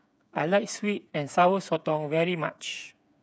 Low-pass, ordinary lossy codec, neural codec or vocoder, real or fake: none; none; codec, 16 kHz, 8 kbps, FreqCodec, smaller model; fake